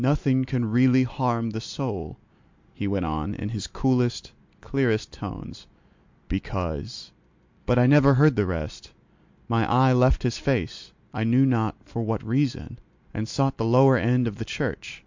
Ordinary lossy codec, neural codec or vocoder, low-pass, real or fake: MP3, 64 kbps; none; 7.2 kHz; real